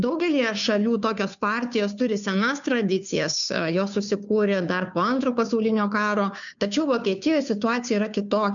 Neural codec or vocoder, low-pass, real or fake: codec, 16 kHz, 2 kbps, FunCodec, trained on Chinese and English, 25 frames a second; 7.2 kHz; fake